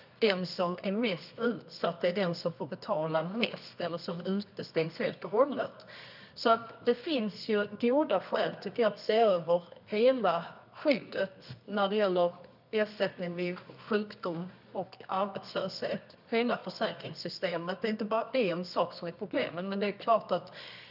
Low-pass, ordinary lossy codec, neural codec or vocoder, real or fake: 5.4 kHz; none; codec, 24 kHz, 0.9 kbps, WavTokenizer, medium music audio release; fake